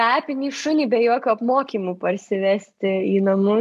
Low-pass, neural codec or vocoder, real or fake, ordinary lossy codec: 14.4 kHz; none; real; AAC, 96 kbps